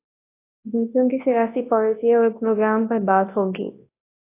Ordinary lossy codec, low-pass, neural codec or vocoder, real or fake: AAC, 24 kbps; 3.6 kHz; codec, 24 kHz, 0.9 kbps, WavTokenizer, large speech release; fake